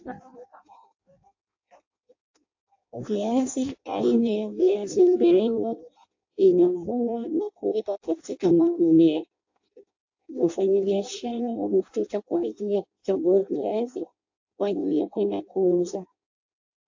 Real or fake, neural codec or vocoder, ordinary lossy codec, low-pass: fake; codec, 16 kHz in and 24 kHz out, 0.6 kbps, FireRedTTS-2 codec; AAC, 48 kbps; 7.2 kHz